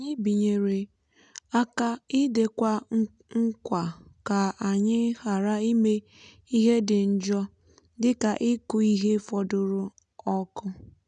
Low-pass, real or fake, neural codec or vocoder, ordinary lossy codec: 9.9 kHz; real; none; none